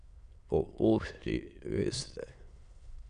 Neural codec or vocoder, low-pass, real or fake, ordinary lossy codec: autoencoder, 22.05 kHz, a latent of 192 numbers a frame, VITS, trained on many speakers; 9.9 kHz; fake; none